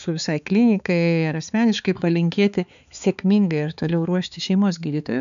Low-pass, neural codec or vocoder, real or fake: 7.2 kHz; codec, 16 kHz, 4 kbps, X-Codec, HuBERT features, trained on balanced general audio; fake